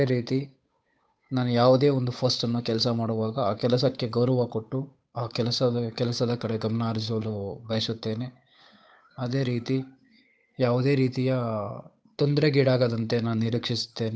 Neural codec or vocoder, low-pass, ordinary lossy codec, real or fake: codec, 16 kHz, 8 kbps, FunCodec, trained on Chinese and English, 25 frames a second; none; none; fake